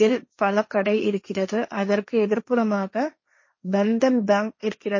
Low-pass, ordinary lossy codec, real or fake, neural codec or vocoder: 7.2 kHz; MP3, 32 kbps; fake; codec, 16 kHz, 1.1 kbps, Voila-Tokenizer